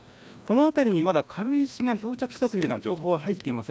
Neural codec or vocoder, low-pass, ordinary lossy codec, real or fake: codec, 16 kHz, 1 kbps, FreqCodec, larger model; none; none; fake